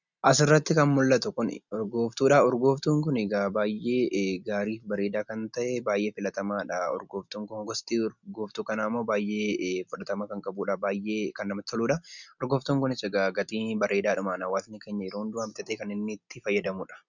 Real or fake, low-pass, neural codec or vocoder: real; 7.2 kHz; none